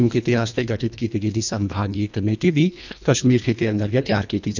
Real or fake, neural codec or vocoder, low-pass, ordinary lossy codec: fake; codec, 24 kHz, 1.5 kbps, HILCodec; 7.2 kHz; none